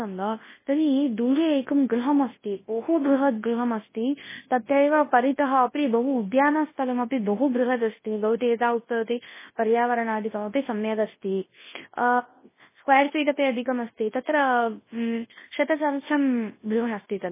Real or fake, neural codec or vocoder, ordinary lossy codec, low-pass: fake; codec, 24 kHz, 0.9 kbps, WavTokenizer, large speech release; MP3, 16 kbps; 3.6 kHz